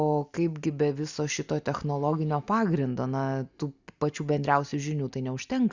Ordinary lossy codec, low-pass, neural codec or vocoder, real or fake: Opus, 64 kbps; 7.2 kHz; none; real